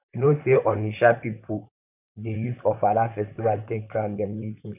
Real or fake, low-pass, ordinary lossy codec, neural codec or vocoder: fake; 3.6 kHz; none; vocoder, 44.1 kHz, 128 mel bands, Pupu-Vocoder